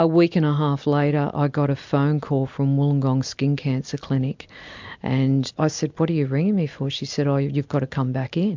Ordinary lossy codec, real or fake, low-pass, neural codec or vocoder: MP3, 64 kbps; real; 7.2 kHz; none